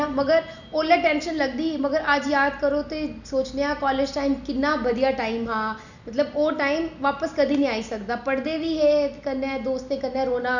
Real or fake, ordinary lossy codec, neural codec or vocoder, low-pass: real; none; none; 7.2 kHz